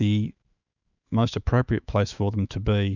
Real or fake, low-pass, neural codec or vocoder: fake; 7.2 kHz; autoencoder, 48 kHz, 128 numbers a frame, DAC-VAE, trained on Japanese speech